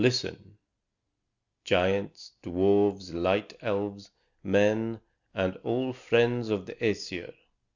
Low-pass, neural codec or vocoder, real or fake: 7.2 kHz; none; real